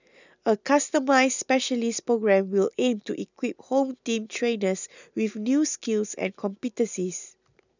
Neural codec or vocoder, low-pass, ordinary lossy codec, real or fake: none; 7.2 kHz; none; real